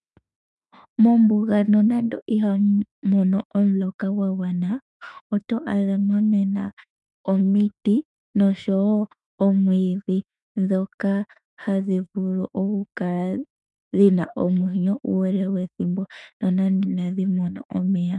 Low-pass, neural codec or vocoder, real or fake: 10.8 kHz; autoencoder, 48 kHz, 32 numbers a frame, DAC-VAE, trained on Japanese speech; fake